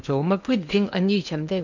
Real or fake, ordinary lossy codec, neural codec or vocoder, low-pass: fake; none; codec, 16 kHz in and 24 kHz out, 0.8 kbps, FocalCodec, streaming, 65536 codes; 7.2 kHz